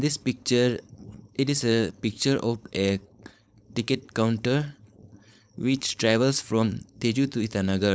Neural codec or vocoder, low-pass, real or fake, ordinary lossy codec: codec, 16 kHz, 4.8 kbps, FACodec; none; fake; none